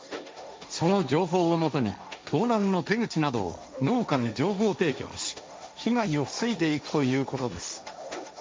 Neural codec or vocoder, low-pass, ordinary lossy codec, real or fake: codec, 16 kHz, 1.1 kbps, Voila-Tokenizer; none; none; fake